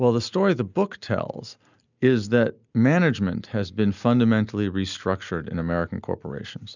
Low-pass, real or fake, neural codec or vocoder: 7.2 kHz; real; none